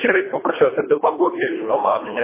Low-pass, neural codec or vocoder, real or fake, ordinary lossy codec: 3.6 kHz; codec, 24 kHz, 1.5 kbps, HILCodec; fake; MP3, 16 kbps